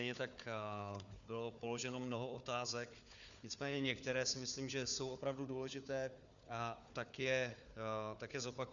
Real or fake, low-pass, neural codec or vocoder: fake; 7.2 kHz; codec, 16 kHz, 4 kbps, FunCodec, trained on Chinese and English, 50 frames a second